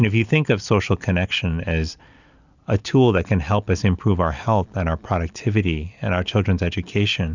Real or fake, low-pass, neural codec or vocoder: real; 7.2 kHz; none